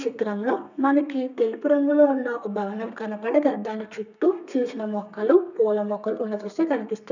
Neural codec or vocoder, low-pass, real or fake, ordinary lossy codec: codec, 32 kHz, 1.9 kbps, SNAC; 7.2 kHz; fake; none